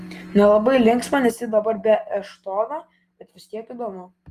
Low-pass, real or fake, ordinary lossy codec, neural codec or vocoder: 14.4 kHz; real; Opus, 24 kbps; none